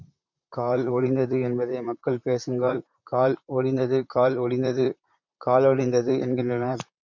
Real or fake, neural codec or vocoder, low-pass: fake; vocoder, 44.1 kHz, 128 mel bands, Pupu-Vocoder; 7.2 kHz